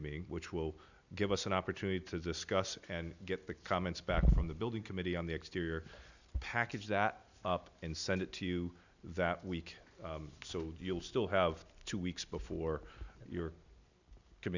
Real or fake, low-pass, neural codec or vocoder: real; 7.2 kHz; none